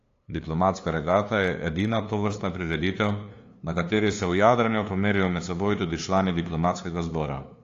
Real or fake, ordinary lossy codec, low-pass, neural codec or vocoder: fake; AAC, 48 kbps; 7.2 kHz; codec, 16 kHz, 2 kbps, FunCodec, trained on LibriTTS, 25 frames a second